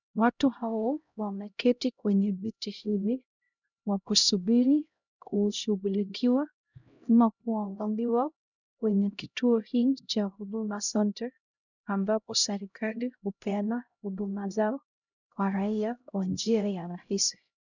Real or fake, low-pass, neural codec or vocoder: fake; 7.2 kHz; codec, 16 kHz, 0.5 kbps, X-Codec, HuBERT features, trained on LibriSpeech